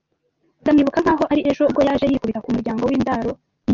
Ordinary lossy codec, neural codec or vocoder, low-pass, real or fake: Opus, 24 kbps; none; 7.2 kHz; real